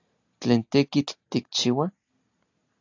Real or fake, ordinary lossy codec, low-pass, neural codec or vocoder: real; AAC, 48 kbps; 7.2 kHz; none